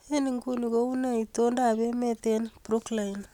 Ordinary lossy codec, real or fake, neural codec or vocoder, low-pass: none; real; none; 19.8 kHz